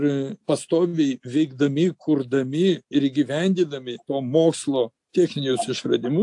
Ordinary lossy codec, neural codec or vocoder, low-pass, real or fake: AAC, 64 kbps; none; 10.8 kHz; real